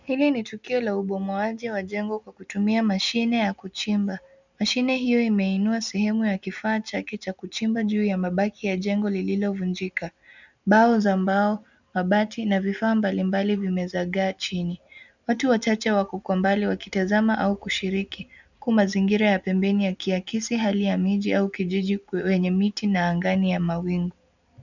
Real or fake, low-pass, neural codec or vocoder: real; 7.2 kHz; none